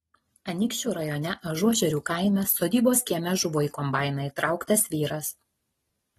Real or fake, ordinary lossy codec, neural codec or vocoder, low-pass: real; AAC, 32 kbps; none; 19.8 kHz